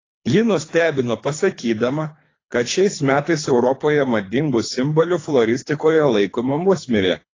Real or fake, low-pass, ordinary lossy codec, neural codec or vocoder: fake; 7.2 kHz; AAC, 32 kbps; codec, 24 kHz, 3 kbps, HILCodec